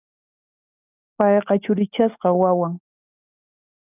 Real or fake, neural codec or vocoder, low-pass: real; none; 3.6 kHz